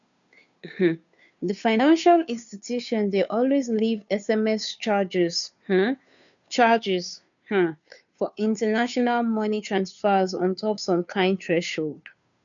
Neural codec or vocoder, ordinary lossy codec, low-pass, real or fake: codec, 16 kHz, 2 kbps, FunCodec, trained on Chinese and English, 25 frames a second; none; 7.2 kHz; fake